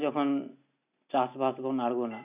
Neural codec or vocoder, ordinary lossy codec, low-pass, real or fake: none; none; 3.6 kHz; real